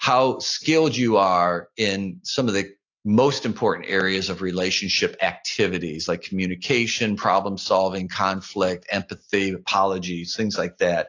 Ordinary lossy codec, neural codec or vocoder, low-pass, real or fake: AAC, 48 kbps; none; 7.2 kHz; real